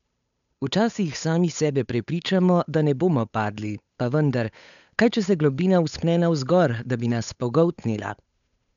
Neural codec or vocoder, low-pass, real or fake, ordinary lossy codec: codec, 16 kHz, 8 kbps, FunCodec, trained on Chinese and English, 25 frames a second; 7.2 kHz; fake; none